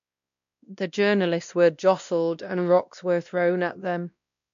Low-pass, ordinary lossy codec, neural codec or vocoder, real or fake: 7.2 kHz; MP3, 64 kbps; codec, 16 kHz, 1 kbps, X-Codec, WavLM features, trained on Multilingual LibriSpeech; fake